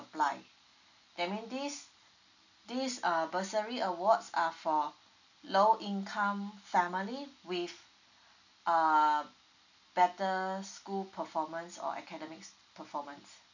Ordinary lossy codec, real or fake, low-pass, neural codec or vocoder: none; real; 7.2 kHz; none